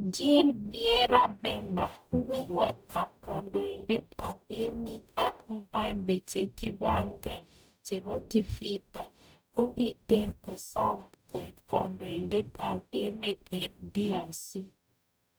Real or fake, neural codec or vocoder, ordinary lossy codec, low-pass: fake; codec, 44.1 kHz, 0.9 kbps, DAC; none; none